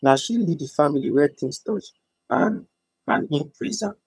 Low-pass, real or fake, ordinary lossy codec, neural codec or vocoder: none; fake; none; vocoder, 22.05 kHz, 80 mel bands, HiFi-GAN